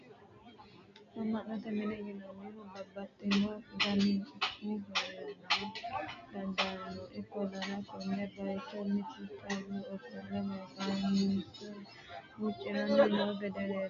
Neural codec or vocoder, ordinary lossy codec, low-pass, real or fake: none; MP3, 64 kbps; 7.2 kHz; real